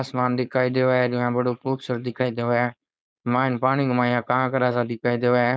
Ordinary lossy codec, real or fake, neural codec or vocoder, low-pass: none; fake; codec, 16 kHz, 4.8 kbps, FACodec; none